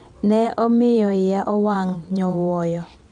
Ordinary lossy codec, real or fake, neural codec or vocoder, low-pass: MP3, 64 kbps; fake; vocoder, 22.05 kHz, 80 mel bands, WaveNeXt; 9.9 kHz